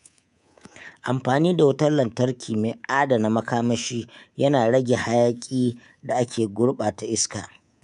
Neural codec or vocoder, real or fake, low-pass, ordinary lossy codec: codec, 24 kHz, 3.1 kbps, DualCodec; fake; 10.8 kHz; none